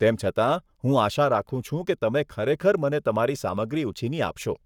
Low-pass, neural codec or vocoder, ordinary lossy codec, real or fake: 19.8 kHz; codec, 44.1 kHz, 7.8 kbps, Pupu-Codec; none; fake